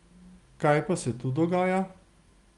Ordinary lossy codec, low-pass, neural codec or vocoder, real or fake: Opus, 32 kbps; 10.8 kHz; none; real